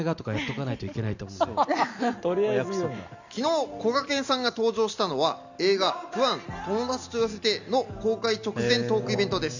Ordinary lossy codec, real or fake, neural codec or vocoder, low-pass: none; real; none; 7.2 kHz